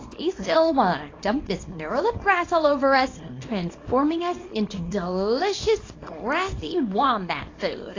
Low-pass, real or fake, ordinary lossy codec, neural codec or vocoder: 7.2 kHz; fake; AAC, 32 kbps; codec, 24 kHz, 0.9 kbps, WavTokenizer, small release